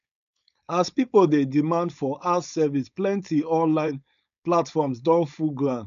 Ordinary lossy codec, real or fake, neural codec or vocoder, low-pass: none; fake; codec, 16 kHz, 4.8 kbps, FACodec; 7.2 kHz